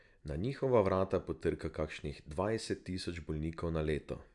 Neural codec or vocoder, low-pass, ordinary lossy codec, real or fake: none; 10.8 kHz; none; real